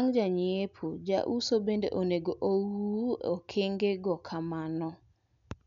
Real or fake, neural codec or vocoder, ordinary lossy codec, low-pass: real; none; none; 7.2 kHz